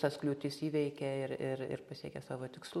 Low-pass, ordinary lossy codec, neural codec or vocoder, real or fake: 19.8 kHz; MP3, 64 kbps; none; real